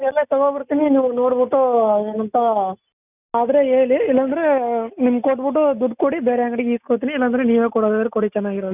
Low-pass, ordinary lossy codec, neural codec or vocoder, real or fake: 3.6 kHz; none; none; real